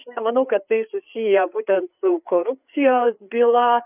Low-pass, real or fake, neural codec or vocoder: 3.6 kHz; fake; codec, 16 kHz, 4 kbps, FreqCodec, larger model